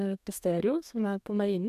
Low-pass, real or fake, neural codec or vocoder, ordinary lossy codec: 14.4 kHz; fake; codec, 32 kHz, 1.9 kbps, SNAC; none